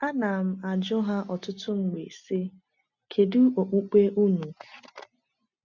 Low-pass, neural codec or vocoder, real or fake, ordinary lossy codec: 7.2 kHz; none; real; none